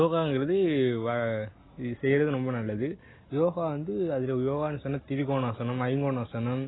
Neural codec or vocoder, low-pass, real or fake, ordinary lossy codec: none; 7.2 kHz; real; AAC, 16 kbps